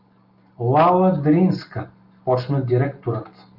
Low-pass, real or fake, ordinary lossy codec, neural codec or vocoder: 5.4 kHz; real; Opus, 32 kbps; none